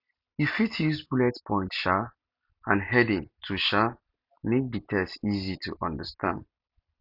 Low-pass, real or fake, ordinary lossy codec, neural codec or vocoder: 5.4 kHz; real; none; none